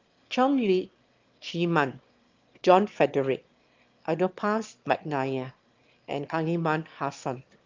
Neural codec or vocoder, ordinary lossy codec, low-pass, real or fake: autoencoder, 22.05 kHz, a latent of 192 numbers a frame, VITS, trained on one speaker; Opus, 32 kbps; 7.2 kHz; fake